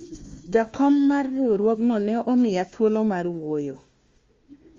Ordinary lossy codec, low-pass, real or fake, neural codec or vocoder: Opus, 32 kbps; 7.2 kHz; fake; codec, 16 kHz, 1 kbps, FunCodec, trained on Chinese and English, 50 frames a second